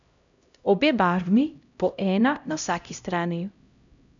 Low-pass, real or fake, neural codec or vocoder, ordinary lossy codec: 7.2 kHz; fake; codec, 16 kHz, 0.5 kbps, X-Codec, HuBERT features, trained on LibriSpeech; none